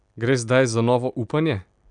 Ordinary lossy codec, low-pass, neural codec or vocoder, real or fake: none; 9.9 kHz; none; real